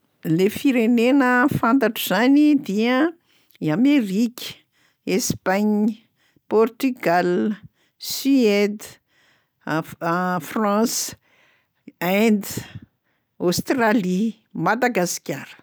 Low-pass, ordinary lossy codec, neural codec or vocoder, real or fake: none; none; none; real